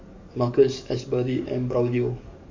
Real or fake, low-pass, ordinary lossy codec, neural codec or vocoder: fake; 7.2 kHz; MP3, 48 kbps; codec, 44.1 kHz, 7.8 kbps, DAC